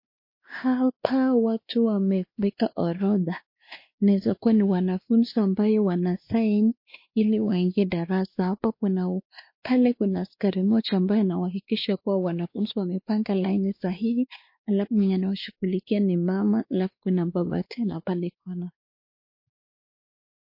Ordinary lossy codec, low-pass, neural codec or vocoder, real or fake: MP3, 32 kbps; 5.4 kHz; codec, 16 kHz, 2 kbps, X-Codec, WavLM features, trained on Multilingual LibriSpeech; fake